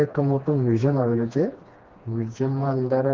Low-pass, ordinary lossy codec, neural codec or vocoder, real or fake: 7.2 kHz; Opus, 16 kbps; codec, 16 kHz, 2 kbps, FreqCodec, smaller model; fake